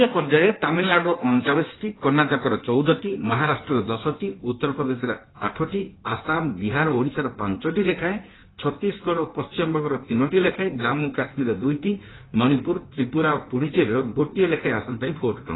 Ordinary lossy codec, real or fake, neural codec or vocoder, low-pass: AAC, 16 kbps; fake; codec, 16 kHz in and 24 kHz out, 1.1 kbps, FireRedTTS-2 codec; 7.2 kHz